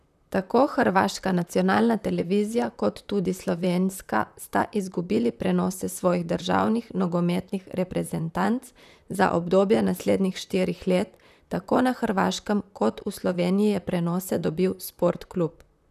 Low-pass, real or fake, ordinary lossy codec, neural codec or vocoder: 14.4 kHz; fake; none; vocoder, 44.1 kHz, 128 mel bands, Pupu-Vocoder